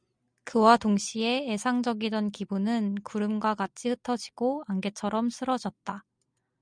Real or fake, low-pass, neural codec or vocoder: real; 9.9 kHz; none